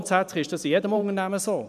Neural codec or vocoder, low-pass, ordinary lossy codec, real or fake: vocoder, 44.1 kHz, 128 mel bands every 512 samples, BigVGAN v2; 14.4 kHz; none; fake